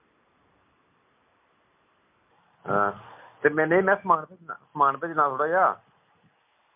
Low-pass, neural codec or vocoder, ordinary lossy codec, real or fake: 3.6 kHz; none; MP3, 32 kbps; real